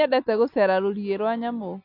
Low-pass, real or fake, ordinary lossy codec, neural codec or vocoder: 5.4 kHz; real; none; none